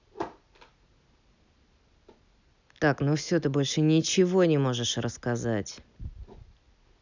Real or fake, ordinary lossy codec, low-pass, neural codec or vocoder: real; none; 7.2 kHz; none